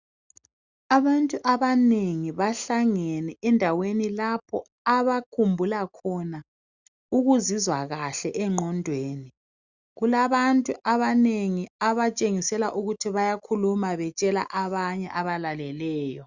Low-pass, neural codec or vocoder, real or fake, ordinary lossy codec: 7.2 kHz; none; real; Opus, 64 kbps